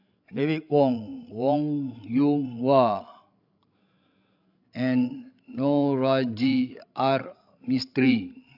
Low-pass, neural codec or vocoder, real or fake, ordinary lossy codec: 5.4 kHz; codec, 16 kHz, 16 kbps, FreqCodec, larger model; fake; AAC, 48 kbps